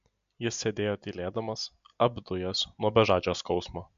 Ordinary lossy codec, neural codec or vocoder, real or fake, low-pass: MP3, 64 kbps; none; real; 7.2 kHz